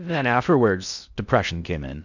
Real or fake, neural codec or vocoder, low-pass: fake; codec, 16 kHz in and 24 kHz out, 0.6 kbps, FocalCodec, streaming, 2048 codes; 7.2 kHz